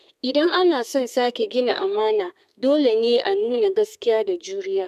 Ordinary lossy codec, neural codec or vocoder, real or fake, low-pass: none; codec, 32 kHz, 1.9 kbps, SNAC; fake; 14.4 kHz